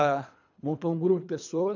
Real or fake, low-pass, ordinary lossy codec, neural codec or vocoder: fake; 7.2 kHz; none; codec, 24 kHz, 3 kbps, HILCodec